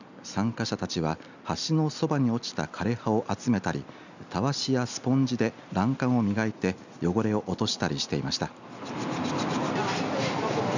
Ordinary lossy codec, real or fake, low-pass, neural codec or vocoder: none; real; 7.2 kHz; none